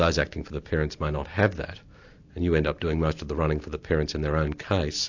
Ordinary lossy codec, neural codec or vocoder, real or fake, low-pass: AAC, 48 kbps; none; real; 7.2 kHz